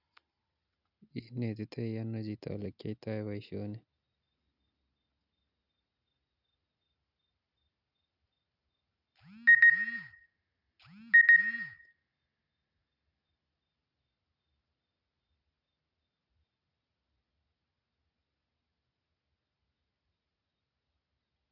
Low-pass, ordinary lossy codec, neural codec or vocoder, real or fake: 5.4 kHz; none; none; real